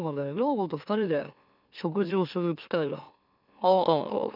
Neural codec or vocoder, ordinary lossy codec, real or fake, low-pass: autoencoder, 44.1 kHz, a latent of 192 numbers a frame, MeloTTS; none; fake; 5.4 kHz